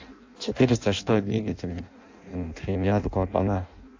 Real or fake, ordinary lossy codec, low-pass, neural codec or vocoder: fake; AAC, 48 kbps; 7.2 kHz; codec, 16 kHz in and 24 kHz out, 0.6 kbps, FireRedTTS-2 codec